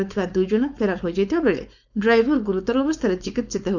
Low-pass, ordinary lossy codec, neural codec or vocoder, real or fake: 7.2 kHz; none; codec, 16 kHz, 4.8 kbps, FACodec; fake